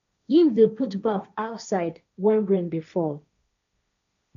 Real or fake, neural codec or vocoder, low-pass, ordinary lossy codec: fake; codec, 16 kHz, 1.1 kbps, Voila-Tokenizer; 7.2 kHz; none